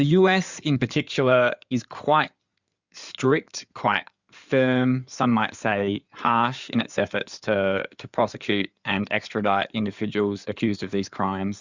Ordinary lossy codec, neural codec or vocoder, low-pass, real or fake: Opus, 64 kbps; codec, 16 kHz in and 24 kHz out, 2.2 kbps, FireRedTTS-2 codec; 7.2 kHz; fake